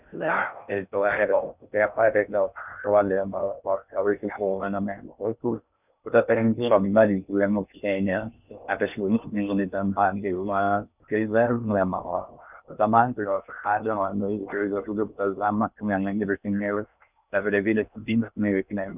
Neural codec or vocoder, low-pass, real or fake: codec, 16 kHz in and 24 kHz out, 0.6 kbps, FocalCodec, streaming, 2048 codes; 3.6 kHz; fake